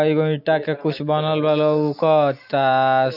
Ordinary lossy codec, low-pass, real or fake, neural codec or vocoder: none; 5.4 kHz; real; none